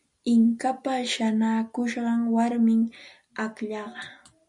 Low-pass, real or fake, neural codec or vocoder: 10.8 kHz; real; none